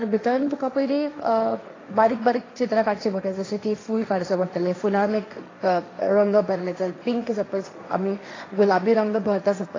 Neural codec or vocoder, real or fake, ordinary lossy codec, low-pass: codec, 16 kHz, 1.1 kbps, Voila-Tokenizer; fake; AAC, 32 kbps; 7.2 kHz